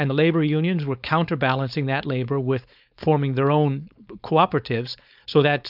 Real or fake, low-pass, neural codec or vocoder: fake; 5.4 kHz; codec, 16 kHz, 4.8 kbps, FACodec